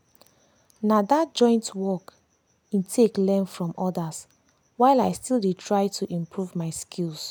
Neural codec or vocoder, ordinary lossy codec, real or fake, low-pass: none; none; real; none